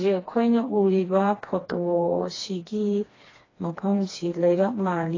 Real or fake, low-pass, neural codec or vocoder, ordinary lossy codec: fake; 7.2 kHz; codec, 16 kHz, 2 kbps, FreqCodec, smaller model; AAC, 32 kbps